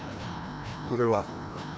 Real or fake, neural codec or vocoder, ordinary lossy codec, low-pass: fake; codec, 16 kHz, 1 kbps, FreqCodec, larger model; none; none